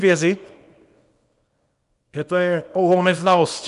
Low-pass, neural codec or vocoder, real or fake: 10.8 kHz; codec, 24 kHz, 0.9 kbps, WavTokenizer, small release; fake